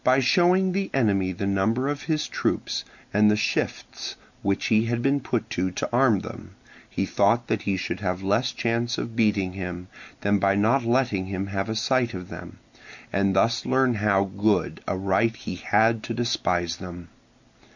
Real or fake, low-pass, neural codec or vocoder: real; 7.2 kHz; none